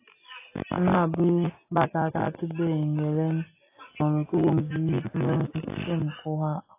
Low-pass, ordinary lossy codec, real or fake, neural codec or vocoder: 3.6 kHz; AAC, 24 kbps; real; none